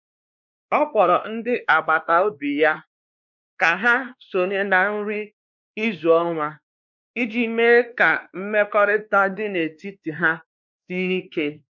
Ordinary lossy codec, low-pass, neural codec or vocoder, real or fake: none; 7.2 kHz; codec, 16 kHz, 2 kbps, X-Codec, WavLM features, trained on Multilingual LibriSpeech; fake